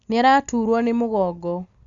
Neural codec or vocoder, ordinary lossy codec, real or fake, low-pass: none; Opus, 64 kbps; real; 7.2 kHz